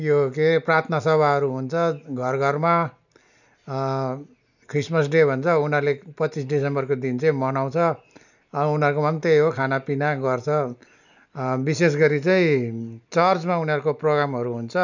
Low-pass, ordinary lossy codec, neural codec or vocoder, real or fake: 7.2 kHz; none; none; real